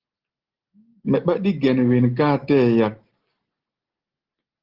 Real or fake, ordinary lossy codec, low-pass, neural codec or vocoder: real; Opus, 16 kbps; 5.4 kHz; none